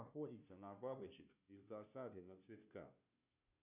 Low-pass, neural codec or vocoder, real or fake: 3.6 kHz; codec, 16 kHz, 0.5 kbps, FunCodec, trained on Chinese and English, 25 frames a second; fake